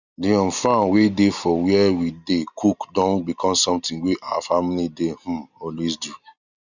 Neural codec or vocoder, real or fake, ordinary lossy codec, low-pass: none; real; none; 7.2 kHz